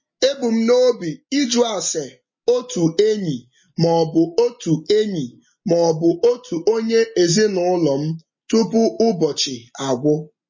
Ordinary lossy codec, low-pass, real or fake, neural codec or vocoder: MP3, 32 kbps; 7.2 kHz; real; none